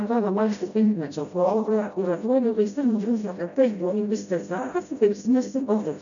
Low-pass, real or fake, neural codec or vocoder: 7.2 kHz; fake; codec, 16 kHz, 0.5 kbps, FreqCodec, smaller model